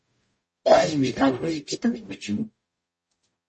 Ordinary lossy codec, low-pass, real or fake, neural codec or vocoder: MP3, 32 kbps; 10.8 kHz; fake; codec, 44.1 kHz, 0.9 kbps, DAC